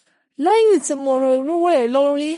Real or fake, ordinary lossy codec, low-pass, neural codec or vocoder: fake; MP3, 48 kbps; 10.8 kHz; codec, 16 kHz in and 24 kHz out, 0.4 kbps, LongCat-Audio-Codec, four codebook decoder